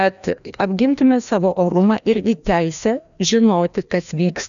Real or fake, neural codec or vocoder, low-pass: fake; codec, 16 kHz, 1 kbps, FreqCodec, larger model; 7.2 kHz